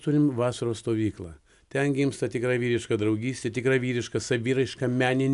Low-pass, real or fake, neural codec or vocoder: 10.8 kHz; real; none